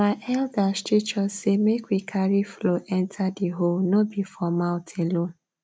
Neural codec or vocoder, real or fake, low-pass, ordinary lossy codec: none; real; none; none